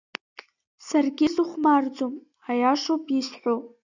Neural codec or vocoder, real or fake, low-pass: none; real; 7.2 kHz